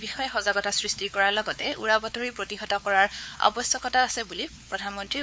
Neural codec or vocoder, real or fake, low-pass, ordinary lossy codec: codec, 16 kHz, 4 kbps, X-Codec, WavLM features, trained on Multilingual LibriSpeech; fake; none; none